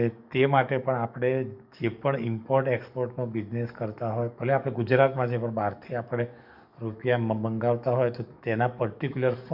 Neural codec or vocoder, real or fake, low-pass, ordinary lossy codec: codec, 44.1 kHz, 7.8 kbps, DAC; fake; 5.4 kHz; none